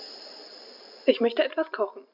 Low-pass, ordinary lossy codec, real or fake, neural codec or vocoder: 5.4 kHz; none; real; none